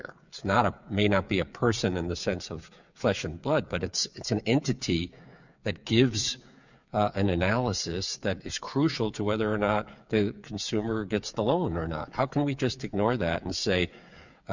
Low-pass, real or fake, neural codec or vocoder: 7.2 kHz; fake; codec, 16 kHz, 8 kbps, FreqCodec, smaller model